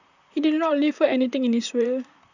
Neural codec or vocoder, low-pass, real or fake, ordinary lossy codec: vocoder, 44.1 kHz, 128 mel bands, Pupu-Vocoder; 7.2 kHz; fake; none